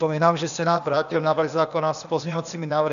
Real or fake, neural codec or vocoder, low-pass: fake; codec, 16 kHz, 0.8 kbps, ZipCodec; 7.2 kHz